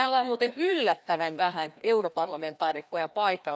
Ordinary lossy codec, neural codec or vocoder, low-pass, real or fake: none; codec, 16 kHz, 1 kbps, FreqCodec, larger model; none; fake